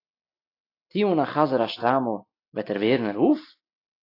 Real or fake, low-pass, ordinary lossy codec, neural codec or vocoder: real; 5.4 kHz; AAC, 32 kbps; none